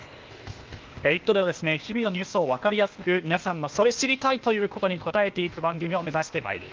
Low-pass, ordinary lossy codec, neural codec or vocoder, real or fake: 7.2 kHz; Opus, 24 kbps; codec, 16 kHz, 0.8 kbps, ZipCodec; fake